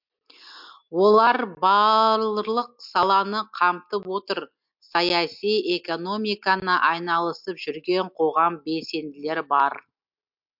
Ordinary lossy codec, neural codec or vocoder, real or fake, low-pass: MP3, 48 kbps; none; real; 5.4 kHz